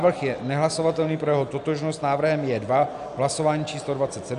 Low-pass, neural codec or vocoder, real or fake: 10.8 kHz; none; real